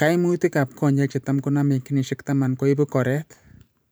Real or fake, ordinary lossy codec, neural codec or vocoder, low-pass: fake; none; vocoder, 44.1 kHz, 128 mel bands every 512 samples, BigVGAN v2; none